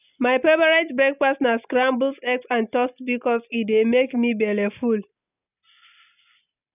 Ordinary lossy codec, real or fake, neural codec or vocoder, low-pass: none; real; none; 3.6 kHz